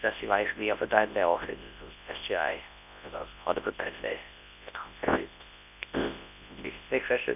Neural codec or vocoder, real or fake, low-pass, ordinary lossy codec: codec, 24 kHz, 0.9 kbps, WavTokenizer, large speech release; fake; 3.6 kHz; none